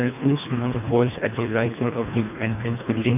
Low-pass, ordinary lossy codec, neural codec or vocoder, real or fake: 3.6 kHz; none; codec, 24 kHz, 1.5 kbps, HILCodec; fake